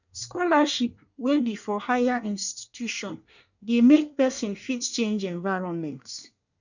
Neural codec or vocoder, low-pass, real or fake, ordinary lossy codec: codec, 24 kHz, 1 kbps, SNAC; 7.2 kHz; fake; none